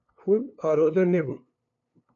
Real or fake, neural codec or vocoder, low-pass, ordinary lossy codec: fake; codec, 16 kHz, 2 kbps, FunCodec, trained on LibriTTS, 25 frames a second; 7.2 kHz; MP3, 96 kbps